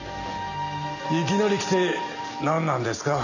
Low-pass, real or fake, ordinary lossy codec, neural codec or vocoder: 7.2 kHz; real; none; none